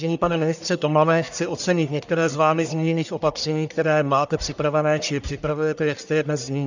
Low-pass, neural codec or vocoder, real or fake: 7.2 kHz; codec, 44.1 kHz, 1.7 kbps, Pupu-Codec; fake